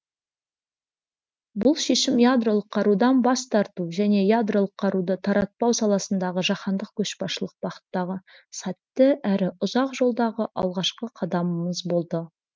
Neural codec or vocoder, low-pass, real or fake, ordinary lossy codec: none; none; real; none